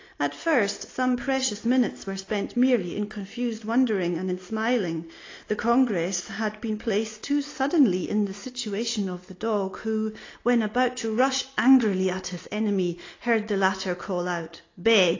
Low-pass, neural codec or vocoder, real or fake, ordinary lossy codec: 7.2 kHz; none; real; AAC, 32 kbps